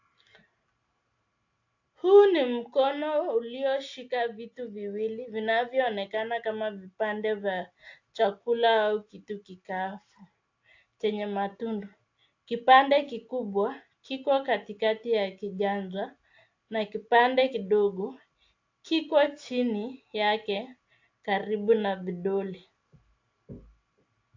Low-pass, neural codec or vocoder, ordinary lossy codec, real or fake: 7.2 kHz; none; AAC, 48 kbps; real